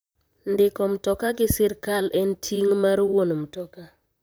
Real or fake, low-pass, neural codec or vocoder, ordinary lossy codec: fake; none; vocoder, 44.1 kHz, 128 mel bands, Pupu-Vocoder; none